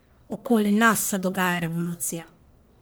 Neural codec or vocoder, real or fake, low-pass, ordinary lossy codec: codec, 44.1 kHz, 1.7 kbps, Pupu-Codec; fake; none; none